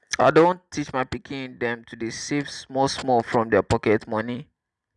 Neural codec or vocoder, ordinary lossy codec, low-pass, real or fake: none; none; 10.8 kHz; real